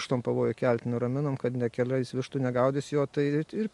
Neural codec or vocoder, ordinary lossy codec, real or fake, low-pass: none; MP3, 64 kbps; real; 10.8 kHz